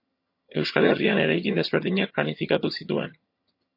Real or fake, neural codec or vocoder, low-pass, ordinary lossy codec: fake; vocoder, 22.05 kHz, 80 mel bands, HiFi-GAN; 5.4 kHz; MP3, 32 kbps